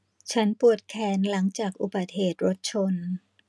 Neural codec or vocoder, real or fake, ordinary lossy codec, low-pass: none; real; none; none